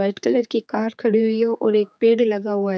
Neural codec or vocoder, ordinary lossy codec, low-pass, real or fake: codec, 16 kHz, 4 kbps, X-Codec, HuBERT features, trained on general audio; none; none; fake